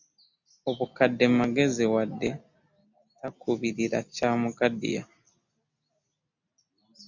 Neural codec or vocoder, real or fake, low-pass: none; real; 7.2 kHz